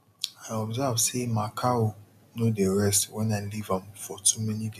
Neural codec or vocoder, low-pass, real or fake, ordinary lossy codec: none; 14.4 kHz; real; none